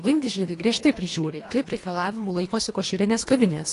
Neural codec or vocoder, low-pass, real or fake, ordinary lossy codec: codec, 24 kHz, 1.5 kbps, HILCodec; 10.8 kHz; fake; AAC, 48 kbps